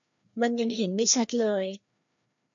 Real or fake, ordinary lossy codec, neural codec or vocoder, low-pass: fake; MP3, 64 kbps; codec, 16 kHz, 1 kbps, FreqCodec, larger model; 7.2 kHz